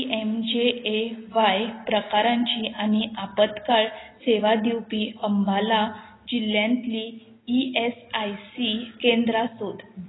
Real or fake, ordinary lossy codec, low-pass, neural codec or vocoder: real; AAC, 16 kbps; 7.2 kHz; none